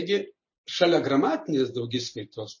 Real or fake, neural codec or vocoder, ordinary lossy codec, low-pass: real; none; MP3, 32 kbps; 7.2 kHz